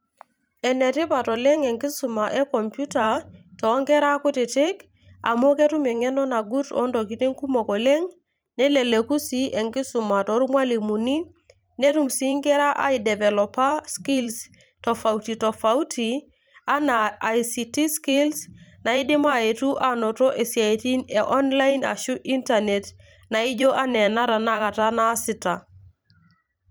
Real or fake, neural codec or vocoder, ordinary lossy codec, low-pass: fake; vocoder, 44.1 kHz, 128 mel bands every 512 samples, BigVGAN v2; none; none